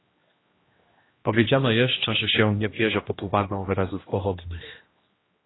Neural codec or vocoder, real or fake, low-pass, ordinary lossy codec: codec, 16 kHz, 1 kbps, X-Codec, HuBERT features, trained on general audio; fake; 7.2 kHz; AAC, 16 kbps